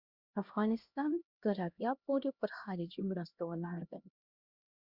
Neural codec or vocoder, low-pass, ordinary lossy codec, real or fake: codec, 16 kHz, 1 kbps, X-Codec, HuBERT features, trained on LibriSpeech; 5.4 kHz; Opus, 64 kbps; fake